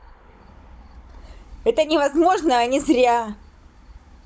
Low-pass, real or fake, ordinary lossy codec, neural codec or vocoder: none; fake; none; codec, 16 kHz, 16 kbps, FunCodec, trained on Chinese and English, 50 frames a second